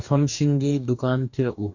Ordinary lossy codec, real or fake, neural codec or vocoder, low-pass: none; fake; codec, 44.1 kHz, 2.6 kbps, DAC; 7.2 kHz